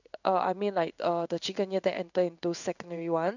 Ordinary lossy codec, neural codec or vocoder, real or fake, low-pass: none; codec, 16 kHz in and 24 kHz out, 1 kbps, XY-Tokenizer; fake; 7.2 kHz